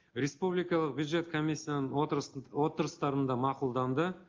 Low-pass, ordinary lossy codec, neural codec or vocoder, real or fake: 7.2 kHz; Opus, 32 kbps; none; real